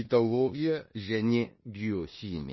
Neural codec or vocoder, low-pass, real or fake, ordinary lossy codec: codec, 16 kHz in and 24 kHz out, 0.9 kbps, LongCat-Audio-Codec, four codebook decoder; 7.2 kHz; fake; MP3, 24 kbps